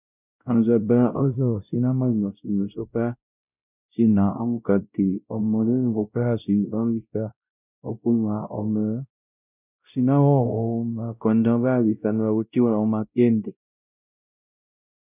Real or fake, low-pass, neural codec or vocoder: fake; 3.6 kHz; codec, 16 kHz, 0.5 kbps, X-Codec, WavLM features, trained on Multilingual LibriSpeech